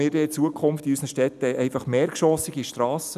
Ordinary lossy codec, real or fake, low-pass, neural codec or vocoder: none; real; 14.4 kHz; none